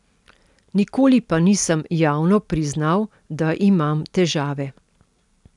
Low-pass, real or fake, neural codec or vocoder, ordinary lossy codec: 10.8 kHz; real; none; none